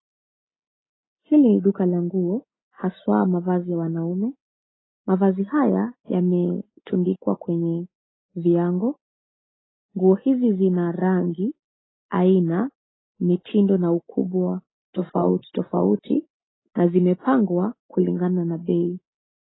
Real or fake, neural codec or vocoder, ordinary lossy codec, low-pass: real; none; AAC, 16 kbps; 7.2 kHz